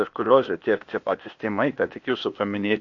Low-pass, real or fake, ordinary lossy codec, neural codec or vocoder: 7.2 kHz; fake; MP3, 48 kbps; codec, 16 kHz, 0.8 kbps, ZipCodec